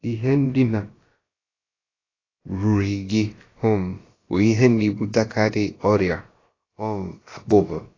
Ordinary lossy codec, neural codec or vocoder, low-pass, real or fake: AAC, 32 kbps; codec, 16 kHz, about 1 kbps, DyCAST, with the encoder's durations; 7.2 kHz; fake